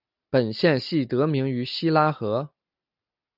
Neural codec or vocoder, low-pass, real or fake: none; 5.4 kHz; real